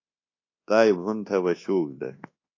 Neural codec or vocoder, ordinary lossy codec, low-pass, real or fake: codec, 24 kHz, 1.2 kbps, DualCodec; AAC, 32 kbps; 7.2 kHz; fake